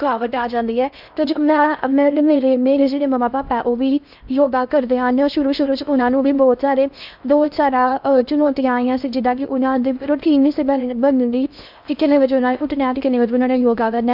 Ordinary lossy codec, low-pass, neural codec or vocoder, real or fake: none; 5.4 kHz; codec, 16 kHz in and 24 kHz out, 0.6 kbps, FocalCodec, streaming, 4096 codes; fake